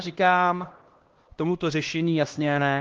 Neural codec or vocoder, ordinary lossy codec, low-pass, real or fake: codec, 16 kHz, 1 kbps, X-Codec, HuBERT features, trained on LibriSpeech; Opus, 16 kbps; 7.2 kHz; fake